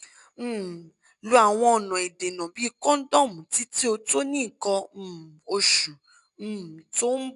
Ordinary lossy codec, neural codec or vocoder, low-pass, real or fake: none; none; 10.8 kHz; real